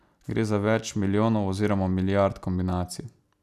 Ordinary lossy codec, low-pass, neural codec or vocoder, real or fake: none; 14.4 kHz; none; real